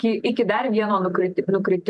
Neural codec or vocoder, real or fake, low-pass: vocoder, 44.1 kHz, 128 mel bands every 256 samples, BigVGAN v2; fake; 10.8 kHz